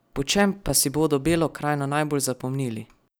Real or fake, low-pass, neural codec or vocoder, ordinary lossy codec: real; none; none; none